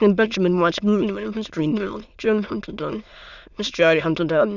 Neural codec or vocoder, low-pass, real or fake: autoencoder, 22.05 kHz, a latent of 192 numbers a frame, VITS, trained on many speakers; 7.2 kHz; fake